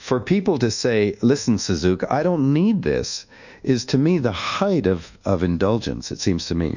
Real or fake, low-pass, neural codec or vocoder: fake; 7.2 kHz; codec, 24 kHz, 1.2 kbps, DualCodec